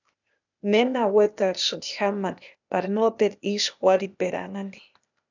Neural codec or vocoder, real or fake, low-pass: codec, 16 kHz, 0.8 kbps, ZipCodec; fake; 7.2 kHz